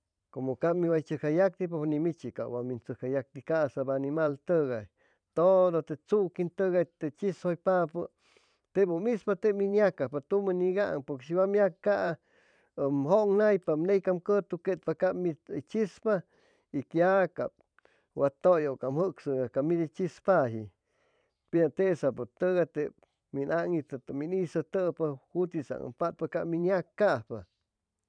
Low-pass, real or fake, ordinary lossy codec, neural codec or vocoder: 9.9 kHz; fake; none; vocoder, 44.1 kHz, 128 mel bands every 512 samples, BigVGAN v2